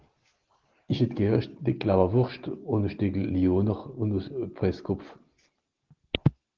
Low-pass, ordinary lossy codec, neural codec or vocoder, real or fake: 7.2 kHz; Opus, 16 kbps; none; real